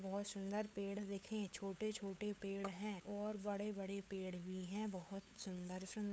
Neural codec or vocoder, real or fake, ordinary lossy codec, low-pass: codec, 16 kHz, 8 kbps, FunCodec, trained on LibriTTS, 25 frames a second; fake; none; none